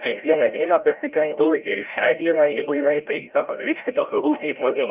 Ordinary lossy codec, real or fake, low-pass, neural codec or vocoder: Opus, 24 kbps; fake; 3.6 kHz; codec, 16 kHz, 0.5 kbps, FreqCodec, larger model